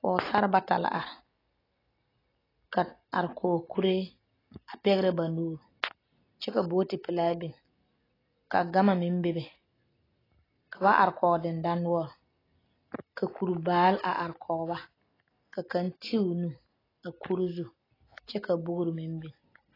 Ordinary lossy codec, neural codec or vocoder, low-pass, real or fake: AAC, 24 kbps; none; 5.4 kHz; real